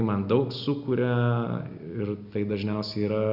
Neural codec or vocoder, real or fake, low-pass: none; real; 5.4 kHz